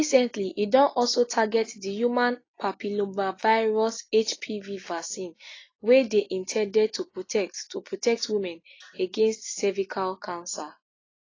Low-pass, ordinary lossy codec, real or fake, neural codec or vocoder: 7.2 kHz; AAC, 32 kbps; real; none